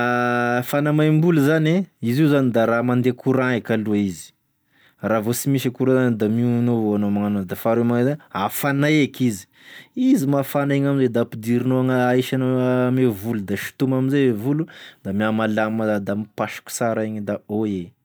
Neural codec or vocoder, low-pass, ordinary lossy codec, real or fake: none; none; none; real